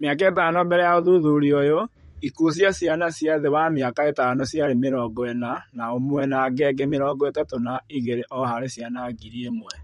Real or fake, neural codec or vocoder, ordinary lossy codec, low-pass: fake; vocoder, 44.1 kHz, 128 mel bands, Pupu-Vocoder; MP3, 48 kbps; 19.8 kHz